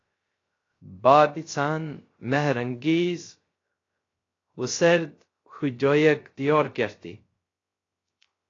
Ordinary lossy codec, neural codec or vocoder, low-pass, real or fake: AAC, 32 kbps; codec, 16 kHz, 0.3 kbps, FocalCodec; 7.2 kHz; fake